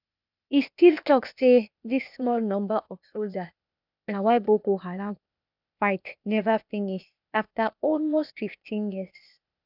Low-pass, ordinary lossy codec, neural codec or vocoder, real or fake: 5.4 kHz; none; codec, 16 kHz, 0.8 kbps, ZipCodec; fake